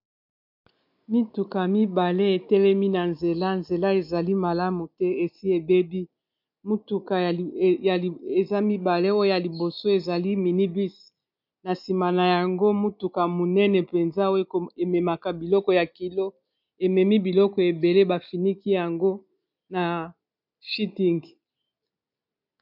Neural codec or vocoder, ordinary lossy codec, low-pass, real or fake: none; MP3, 48 kbps; 5.4 kHz; real